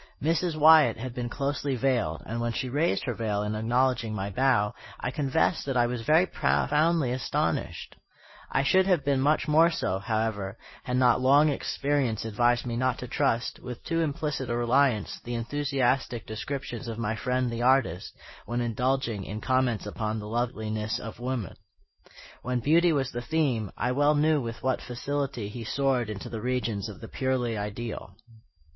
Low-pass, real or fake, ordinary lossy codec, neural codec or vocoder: 7.2 kHz; real; MP3, 24 kbps; none